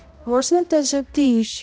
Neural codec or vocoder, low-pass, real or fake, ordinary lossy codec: codec, 16 kHz, 0.5 kbps, X-Codec, HuBERT features, trained on balanced general audio; none; fake; none